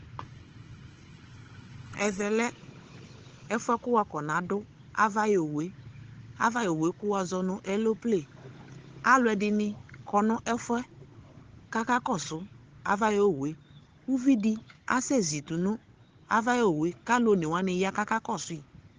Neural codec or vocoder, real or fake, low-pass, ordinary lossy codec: codec, 16 kHz, 16 kbps, FunCodec, trained on Chinese and English, 50 frames a second; fake; 7.2 kHz; Opus, 16 kbps